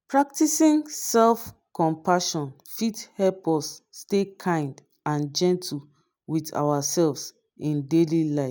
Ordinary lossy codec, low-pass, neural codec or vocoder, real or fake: none; none; none; real